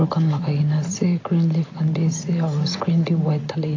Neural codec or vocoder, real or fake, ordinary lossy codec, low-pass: none; real; AAC, 32 kbps; 7.2 kHz